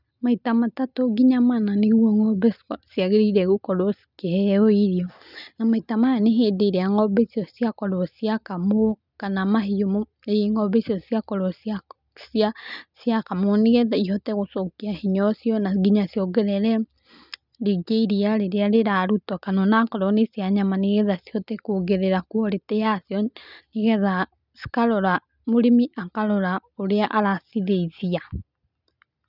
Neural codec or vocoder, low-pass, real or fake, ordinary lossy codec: none; 5.4 kHz; real; none